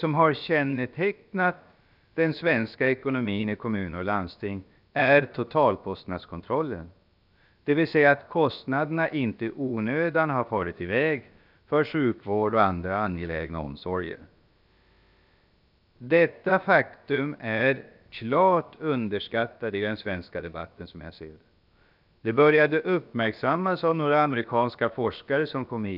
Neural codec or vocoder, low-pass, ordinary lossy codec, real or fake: codec, 16 kHz, about 1 kbps, DyCAST, with the encoder's durations; 5.4 kHz; none; fake